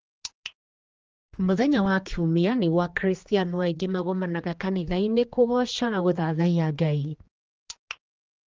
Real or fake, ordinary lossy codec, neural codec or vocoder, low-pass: fake; Opus, 24 kbps; codec, 16 kHz, 2 kbps, X-Codec, HuBERT features, trained on general audio; 7.2 kHz